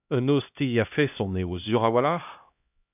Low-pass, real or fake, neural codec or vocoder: 3.6 kHz; fake; codec, 16 kHz, 1 kbps, X-Codec, HuBERT features, trained on LibriSpeech